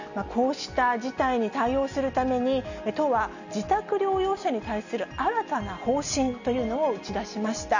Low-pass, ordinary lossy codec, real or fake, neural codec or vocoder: 7.2 kHz; none; real; none